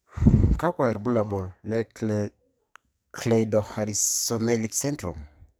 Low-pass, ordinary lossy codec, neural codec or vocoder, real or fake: none; none; codec, 44.1 kHz, 2.6 kbps, SNAC; fake